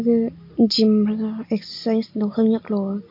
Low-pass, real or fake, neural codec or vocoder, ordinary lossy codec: 5.4 kHz; real; none; MP3, 32 kbps